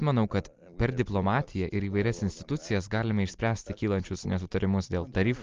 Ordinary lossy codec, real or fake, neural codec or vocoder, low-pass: Opus, 32 kbps; real; none; 7.2 kHz